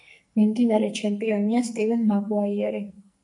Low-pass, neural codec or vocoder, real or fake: 10.8 kHz; codec, 44.1 kHz, 2.6 kbps, SNAC; fake